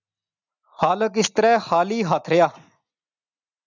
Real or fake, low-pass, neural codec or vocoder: real; 7.2 kHz; none